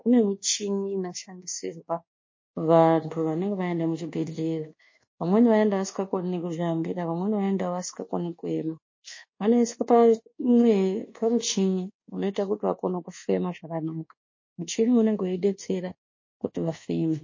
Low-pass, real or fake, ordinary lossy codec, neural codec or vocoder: 7.2 kHz; fake; MP3, 32 kbps; codec, 24 kHz, 1.2 kbps, DualCodec